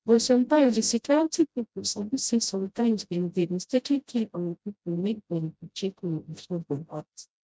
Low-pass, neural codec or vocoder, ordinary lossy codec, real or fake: none; codec, 16 kHz, 0.5 kbps, FreqCodec, smaller model; none; fake